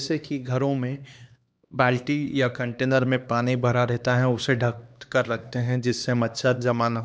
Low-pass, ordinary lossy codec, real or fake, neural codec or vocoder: none; none; fake; codec, 16 kHz, 2 kbps, X-Codec, HuBERT features, trained on LibriSpeech